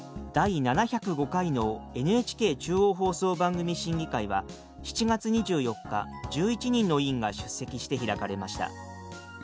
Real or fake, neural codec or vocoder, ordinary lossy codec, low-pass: real; none; none; none